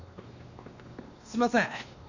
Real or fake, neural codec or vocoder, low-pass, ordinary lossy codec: fake; codec, 16 kHz, 2 kbps, X-Codec, WavLM features, trained on Multilingual LibriSpeech; 7.2 kHz; none